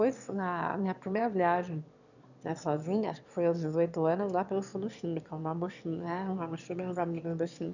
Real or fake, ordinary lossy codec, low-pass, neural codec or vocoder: fake; none; 7.2 kHz; autoencoder, 22.05 kHz, a latent of 192 numbers a frame, VITS, trained on one speaker